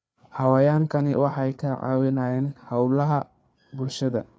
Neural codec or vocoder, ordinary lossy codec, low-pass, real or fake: codec, 16 kHz, 4 kbps, FreqCodec, larger model; none; none; fake